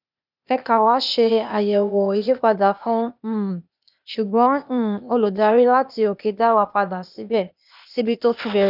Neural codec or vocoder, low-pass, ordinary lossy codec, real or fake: codec, 16 kHz, 0.8 kbps, ZipCodec; 5.4 kHz; none; fake